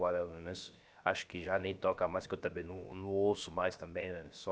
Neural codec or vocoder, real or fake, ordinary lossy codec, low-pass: codec, 16 kHz, about 1 kbps, DyCAST, with the encoder's durations; fake; none; none